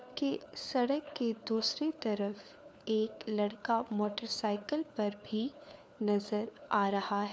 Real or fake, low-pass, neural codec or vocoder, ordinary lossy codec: fake; none; codec, 16 kHz, 4 kbps, FunCodec, trained on LibriTTS, 50 frames a second; none